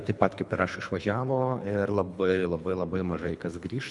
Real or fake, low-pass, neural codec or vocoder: fake; 10.8 kHz; codec, 24 kHz, 3 kbps, HILCodec